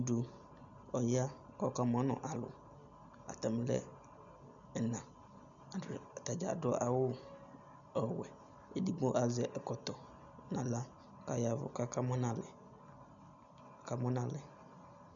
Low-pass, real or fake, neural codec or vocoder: 7.2 kHz; real; none